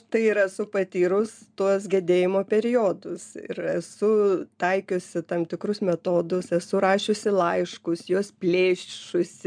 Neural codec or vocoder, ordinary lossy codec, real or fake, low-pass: none; MP3, 96 kbps; real; 9.9 kHz